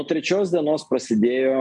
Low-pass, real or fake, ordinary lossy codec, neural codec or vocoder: 10.8 kHz; real; MP3, 64 kbps; none